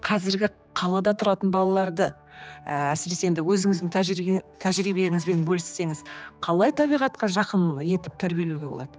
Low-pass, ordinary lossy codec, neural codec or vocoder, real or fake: none; none; codec, 16 kHz, 2 kbps, X-Codec, HuBERT features, trained on general audio; fake